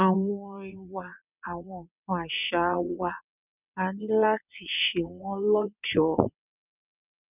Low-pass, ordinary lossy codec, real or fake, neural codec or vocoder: 3.6 kHz; none; fake; vocoder, 22.05 kHz, 80 mel bands, WaveNeXt